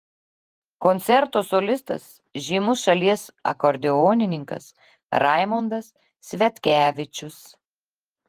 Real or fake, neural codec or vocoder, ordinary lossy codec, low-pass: fake; vocoder, 48 kHz, 128 mel bands, Vocos; Opus, 24 kbps; 14.4 kHz